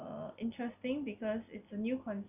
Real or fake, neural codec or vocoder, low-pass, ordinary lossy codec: real; none; 3.6 kHz; none